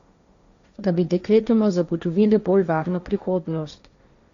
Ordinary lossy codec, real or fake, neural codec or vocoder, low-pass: Opus, 64 kbps; fake; codec, 16 kHz, 1.1 kbps, Voila-Tokenizer; 7.2 kHz